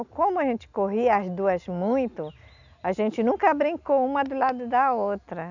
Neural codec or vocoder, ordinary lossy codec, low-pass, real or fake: none; none; 7.2 kHz; real